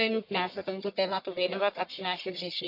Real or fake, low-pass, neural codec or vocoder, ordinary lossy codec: fake; 5.4 kHz; codec, 44.1 kHz, 1.7 kbps, Pupu-Codec; none